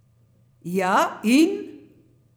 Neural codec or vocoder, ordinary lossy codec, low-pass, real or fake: vocoder, 44.1 kHz, 128 mel bands every 256 samples, BigVGAN v2; none; none; fake